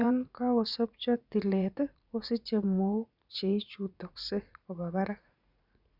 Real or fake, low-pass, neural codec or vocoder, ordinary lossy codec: fake; 5.4 kHz; vocoder, 44.1 kHz, 80 mel bands, Vocos; none